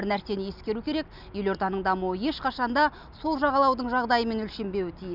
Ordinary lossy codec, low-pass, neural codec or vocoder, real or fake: none; 5.4 kHz; none; real